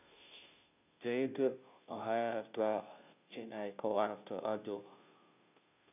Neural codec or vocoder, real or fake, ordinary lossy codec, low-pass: codec, 16 kHz, 0.5 kbps, FunCodec, trained on Chinese and English, 25 frames a second; fake; none; 3.6 kHz